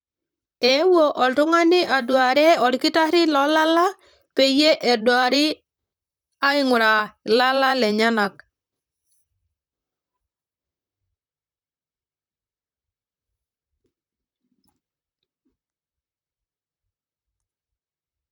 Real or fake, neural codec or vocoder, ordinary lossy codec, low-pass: fake; vocoder, 44.1 kHz, 128 mel bands, Pupu-Vocoder; none; none